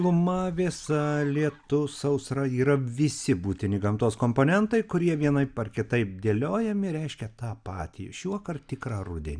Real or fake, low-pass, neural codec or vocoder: real; 9.9 kHz; none